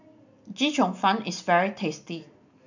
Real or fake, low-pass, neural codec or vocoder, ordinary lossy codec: real; 7.2 kHz; none; none